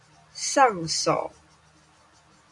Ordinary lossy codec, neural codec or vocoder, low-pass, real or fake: MP3, 64 kbps; none; 10.8 kHz; real